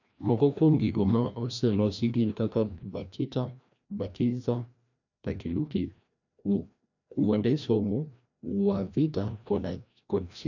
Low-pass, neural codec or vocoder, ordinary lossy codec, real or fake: 7.2 kHz; codec, 16 kHz, 1 kbps, FreqCodec, larger model; none; fake